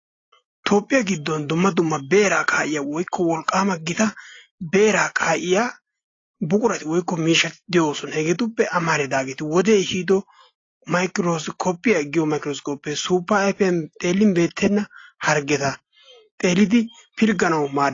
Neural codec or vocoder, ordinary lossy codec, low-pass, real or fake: none; AAC, 32 kbps; 7.2 kHz; real